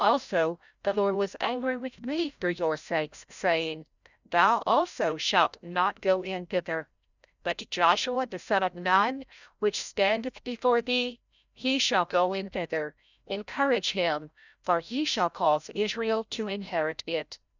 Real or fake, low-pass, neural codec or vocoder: fake; 7.2 kHz; codec, 16 kHz, 0.5 kbps, FreqCodec, larger model